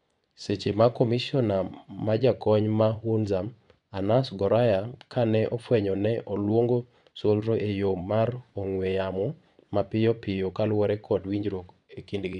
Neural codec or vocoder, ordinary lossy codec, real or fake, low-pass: vocoder, 24 kHz, 100 mel bands, Vocos; none; fake; 10.8 kHz